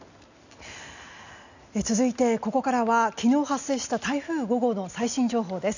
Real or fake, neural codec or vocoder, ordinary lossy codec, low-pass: real; none; none; 7.2 kHz